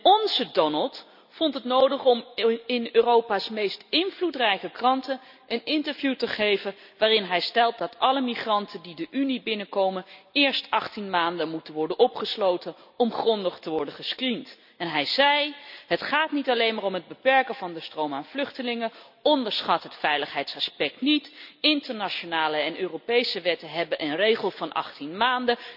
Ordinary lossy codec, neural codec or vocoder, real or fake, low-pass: none; none; real; 5.4 kHz